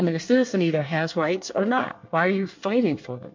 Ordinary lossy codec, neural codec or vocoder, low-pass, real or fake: MP3, 48 kbps; codec, 24 kHz, 1 kbps, SNAC; 7.2 kHz; fake